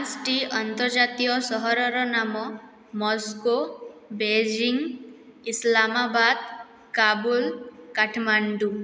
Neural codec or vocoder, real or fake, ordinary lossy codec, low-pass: none; real; none; none